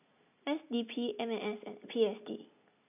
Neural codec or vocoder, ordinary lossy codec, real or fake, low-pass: none; none; real; 3.6 kHz